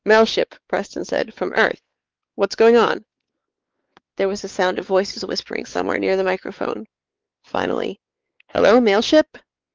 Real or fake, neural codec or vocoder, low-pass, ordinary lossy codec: fake; codec, 16 kHz, 4 kbps, X-Codec, WavLM features, trained on Multilingual LibriSpeech; 7.2 kHz; Opus, 32 kbps